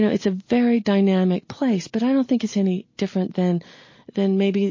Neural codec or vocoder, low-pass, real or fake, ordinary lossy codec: none; 7.2 kHz; real; MP3, 32 kbps